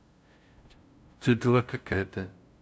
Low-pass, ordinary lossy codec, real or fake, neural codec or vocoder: none; none; fake; codec, 16 kHz, 0.5 kbps, FunCodec, trained on LibriTTS, 25 frames a second